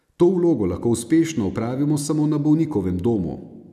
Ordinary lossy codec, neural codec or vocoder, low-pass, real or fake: none; none; 14.4 kHz; real